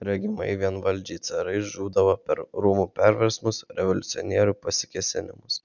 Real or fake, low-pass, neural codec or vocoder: real; 7.2 kHz; none